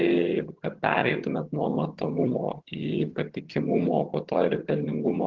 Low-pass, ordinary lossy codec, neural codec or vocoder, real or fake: 7.2 kHz; Opus, 16 kbps; vocoder, 22.05 kHz, 80 mel bands, HiFi-GAN; fake